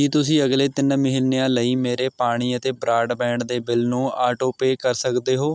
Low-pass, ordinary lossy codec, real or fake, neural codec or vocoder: none; none; real; none